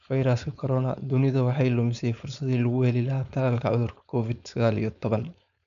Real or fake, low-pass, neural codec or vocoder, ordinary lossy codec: fake; 7.2 kHz; codec, 16 kHz, 4.8 kbps, FACodec; none